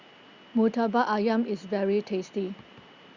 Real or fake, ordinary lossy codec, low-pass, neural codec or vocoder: real; Opus, 64 kbps; 7.2 kHz; none